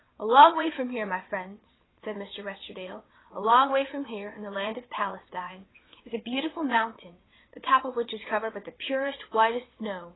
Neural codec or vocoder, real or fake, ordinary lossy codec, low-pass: vocoder, 22.05 kHz, 80 mel bands, WaveNeXt; fake; AAC, 16 kbps; 7.2 kHz